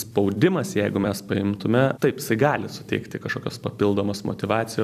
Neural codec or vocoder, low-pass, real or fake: vocoder, 44.1 kHz, 128 mel bands every 256 samples, BigVGAN v2; 14.4 kHz; fake